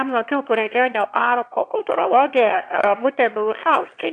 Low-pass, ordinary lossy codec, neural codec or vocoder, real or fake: 9.9 kHz; AAC, 64 kbps; autoencoder, 22.05 kHz, a latent of 192 numbers a frame, VITS, trained on one speaker; fake